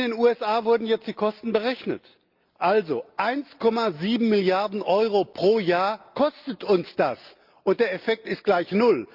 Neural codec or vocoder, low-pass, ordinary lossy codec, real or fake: none; 5.4 kHz; Opus, 24 kbps; real